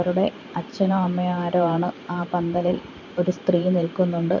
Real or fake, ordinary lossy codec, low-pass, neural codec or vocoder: fake; none; 7.2 kHz; vocoder, 44.1 kHz, 128 mel bands every 512 samples, BigVGAN v2